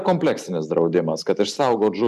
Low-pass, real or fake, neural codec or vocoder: 14.4 kHz; real; none